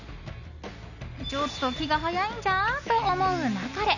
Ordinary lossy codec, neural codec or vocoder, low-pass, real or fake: none; none; 7.2 kHz; real